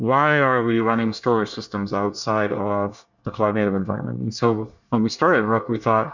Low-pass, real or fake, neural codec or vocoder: 7.2 kHz; fake; codec, 24 kHz, 1 kbps, SNAC